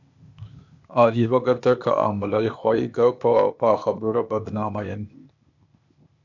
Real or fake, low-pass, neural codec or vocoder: fake; 7.2 kHz; codec, 16 kHz, 0.8 kbps, ZipCodec